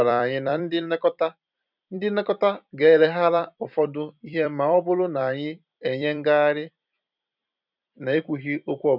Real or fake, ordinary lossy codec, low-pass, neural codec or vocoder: fake; none; 5.4 kHz; vocoder, 44.1 kHz, 128 mel bands every 256 samples, BigVGAN v2